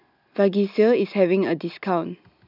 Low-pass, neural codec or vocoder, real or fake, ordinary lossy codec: 5.4 kHz; none; real; none